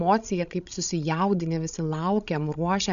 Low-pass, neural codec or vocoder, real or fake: 7.2 kHz; codec, 16 kHz, 16 kbps, FreqCodec, larger model; fake